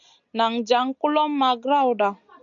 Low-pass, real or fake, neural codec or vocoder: 7.2 kHz; real; none